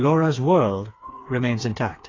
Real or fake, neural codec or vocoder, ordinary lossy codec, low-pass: fake; codec, 16 kHz, 4 kbps, FreqCodec, smaller model; AAC, 32 kbps; 7.2 kHz